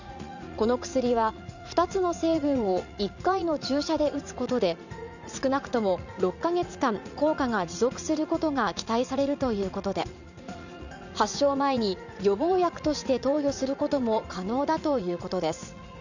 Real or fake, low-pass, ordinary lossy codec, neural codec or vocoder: fake; 7.2 kHz; none; vocoder, 44.1 kHz, 80 mel bands, Vocos